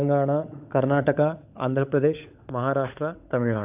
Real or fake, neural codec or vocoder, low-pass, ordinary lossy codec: fake; codec, 16 kHz, 16 kbps, FunCodec, trained on LibriTTS, 50 frames a second; 3.6 kHz; AAC, 32 kbps